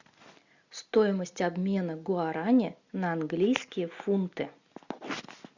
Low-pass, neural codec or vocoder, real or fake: 7.2 kHz; none; real